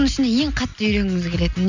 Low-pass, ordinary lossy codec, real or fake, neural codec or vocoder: 7.2 kHz; none; real; none